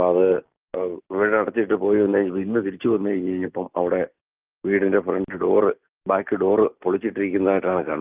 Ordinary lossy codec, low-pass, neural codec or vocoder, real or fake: Opus, 32 kbps; 3.6 kHz; none; real